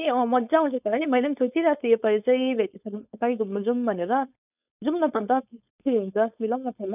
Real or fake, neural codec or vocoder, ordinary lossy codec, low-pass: fake; codec, 16 kHz, 4.8 kbps, FACodec; none; 3.6 kHz